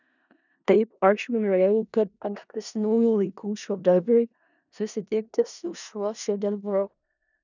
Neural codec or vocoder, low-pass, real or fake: codec, 16 kHz in and 24 kHz out, 0.4 kbps, LongCat-Audio-Codec, four codebook decoder; 7.2 kHz; fake